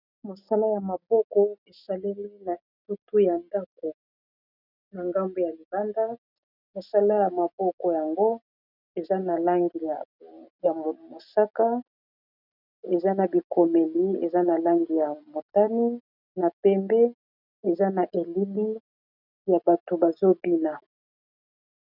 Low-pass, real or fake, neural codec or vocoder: 5.4 kHz; real; none